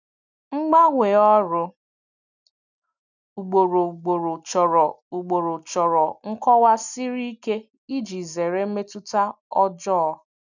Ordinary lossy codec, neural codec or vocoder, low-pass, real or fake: none; none; 7.2 kHz; real